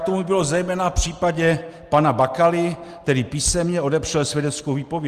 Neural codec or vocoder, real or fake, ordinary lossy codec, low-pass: none; real; Opus, 24 kbps; 14.4 kHz